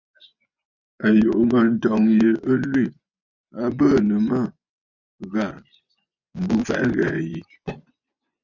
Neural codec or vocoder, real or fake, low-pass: vocoder, 24 kHz, 100 mel bands, Vocos; fake; 7.2 kHz